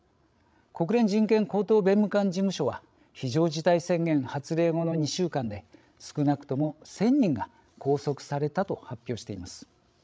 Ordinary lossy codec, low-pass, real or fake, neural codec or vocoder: none; none; fake; codec, 16 kHz, 16 kbps, FreqCodec, larger model